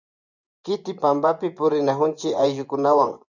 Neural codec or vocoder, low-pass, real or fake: vocoder, 24 kHz, 100 mel bands, Vocos; 7.2 kHz; fake